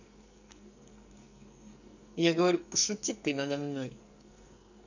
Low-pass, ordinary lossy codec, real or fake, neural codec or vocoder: 7.2 kHz; none; fake; codec, 44.1 kHz, 2.6 kbps, SNAC